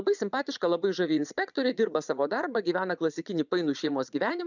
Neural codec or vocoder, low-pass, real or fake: vocoder, 22.05 kHz, 80 mel bands, Vocos; 7.2 kHz; fake